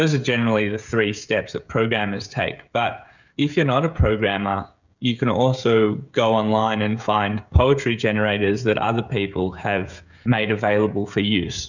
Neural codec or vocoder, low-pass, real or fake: codec, 16 kHz, 16 kbps, FreqCodec, smaller model; 7.2 kHz; fake